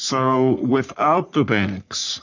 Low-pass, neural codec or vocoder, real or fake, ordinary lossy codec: 7.2 kHz; codec, 44.1 kHz, 3.4 kbps, Pupu-Codec; fake; MP3, 64 kbps